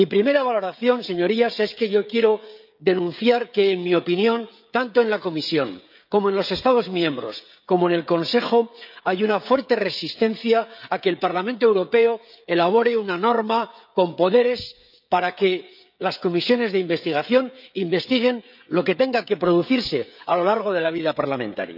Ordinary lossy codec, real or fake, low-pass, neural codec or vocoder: none; fake; 5.4 kHz; codec, 16 kHz, 16 kbps, FreqCodec, smaller model